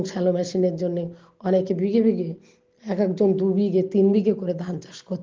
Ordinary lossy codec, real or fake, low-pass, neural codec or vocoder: Opus, 16 kbps; real; 7.2 kHz; none